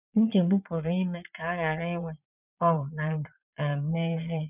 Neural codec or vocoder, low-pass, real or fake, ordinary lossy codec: vocoder, 22.05 kHz, 80 mel bands, Vocos; 3.6 kHz; fake; none